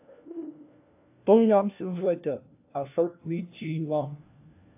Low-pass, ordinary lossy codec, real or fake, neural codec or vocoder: 3.6 kHz; AAC, 32 kbps; fake; codec, 16 kHz, 1 kbps, FunCodec, trained on LibriTTS, 50 frames a second